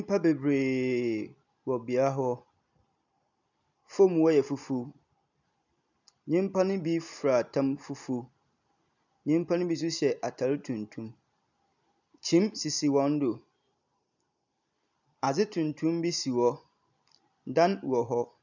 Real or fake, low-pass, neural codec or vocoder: real; 7.2 kHz; none